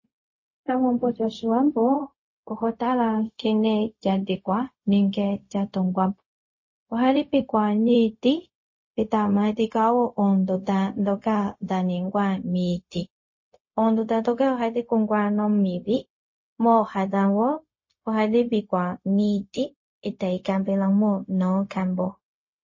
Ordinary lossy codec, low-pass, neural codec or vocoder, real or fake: MP3, 32 kbps; 7.2 kHz; codec, 16 kHz, 0.4 kbps, LongCat-Audio-Codec; fake